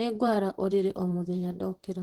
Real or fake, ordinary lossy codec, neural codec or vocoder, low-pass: fake; Opus, 16 kbps; vocoder, 44.1 kHz, 128 mel bands, Pupu-Vocoder; 19.8 kHz